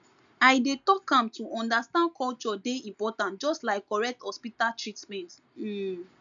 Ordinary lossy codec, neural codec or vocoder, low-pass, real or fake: none; none; 7.2 kHz; real